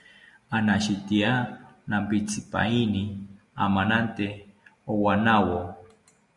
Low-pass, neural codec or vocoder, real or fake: 10.8 kHz; none; real